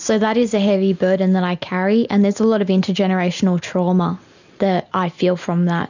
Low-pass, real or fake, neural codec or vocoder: 7.2 kHz; real; none